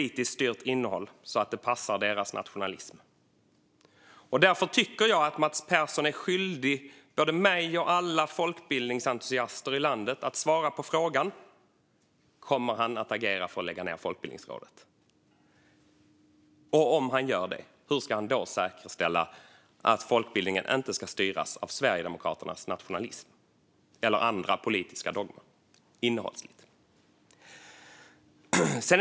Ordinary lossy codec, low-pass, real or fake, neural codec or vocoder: none; none; real; none